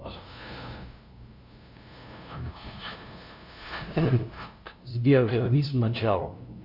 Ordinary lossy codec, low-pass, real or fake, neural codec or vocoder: Opus, 64 kbps; 5.4 kHz; fake; codec, 16 kHz, 0.5 kbps, FunCodec, trained on LibriTTS, 25 frames a second